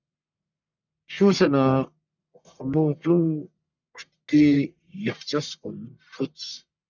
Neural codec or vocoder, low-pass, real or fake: codec, 44.1 kHz, 1.7 kbps, Pupu-Codec; 7.2 kHz; fake